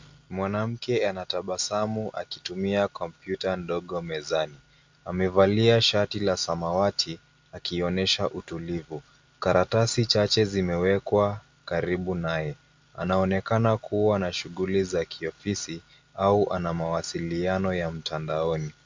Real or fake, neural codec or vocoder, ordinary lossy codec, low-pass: real; none; MP3, 64 kbps; 7.2 kHz